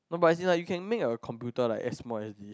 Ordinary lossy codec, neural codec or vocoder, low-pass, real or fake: none; none; none; real